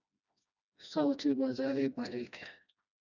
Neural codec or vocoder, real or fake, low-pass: codec, 16 kHz, 1 kbps, FreqCodec, smaller model; fake; 7.2 kHz